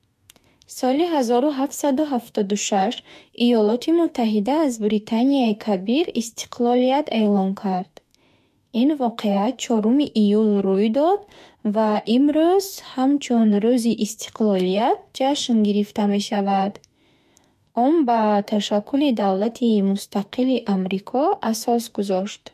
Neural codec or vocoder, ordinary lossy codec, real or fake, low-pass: autoencoder, 48 kHz, 32 numbers a frame, DAC-VAE, trained on Japanese speech; MP3, 64 kbps; fake; 14.4 kHz